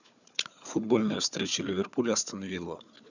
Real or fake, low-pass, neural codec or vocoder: fake; 7.2 kHz; codec, 16 kHz, 4 kbps, FunCodec, trained on Chinese and English, 50 frames a second